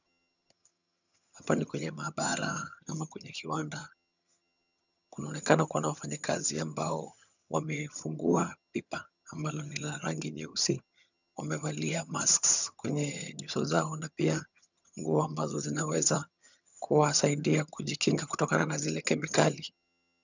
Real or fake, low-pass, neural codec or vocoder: fake; 7.2 kHz; vocoder, 22.05 kHz, 80 mel bands, HiFi-GAN